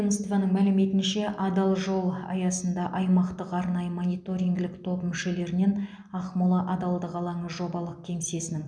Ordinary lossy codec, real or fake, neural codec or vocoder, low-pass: none; real; none; 9.9 kHz